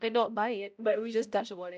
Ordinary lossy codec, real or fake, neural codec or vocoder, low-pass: none; fake; codec, 16 kHz, 0.5 kbps, X-Codec, HuBERT features, trained on balanced general audio; none